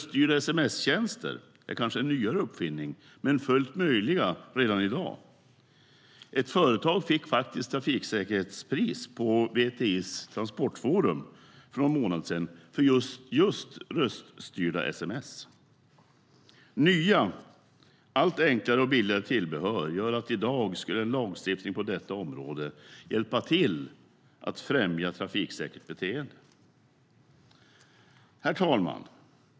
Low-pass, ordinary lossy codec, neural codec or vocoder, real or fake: none; none; none; real